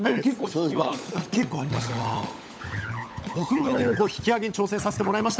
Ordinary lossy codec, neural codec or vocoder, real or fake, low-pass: none; codec, 16 kHz, 16 kbps, FunCodec, trained on LibriTTS, 50 frames a second; fake; none